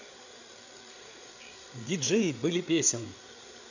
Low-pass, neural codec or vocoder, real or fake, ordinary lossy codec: 7.2 kHz; codec, 16 kHz, 8 kbps, FreqCodec, larger model; fake; none